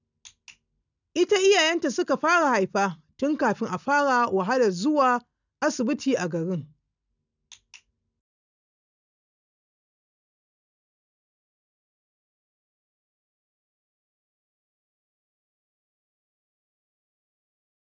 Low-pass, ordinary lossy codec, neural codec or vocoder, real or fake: 7.2 kHz; none; none; real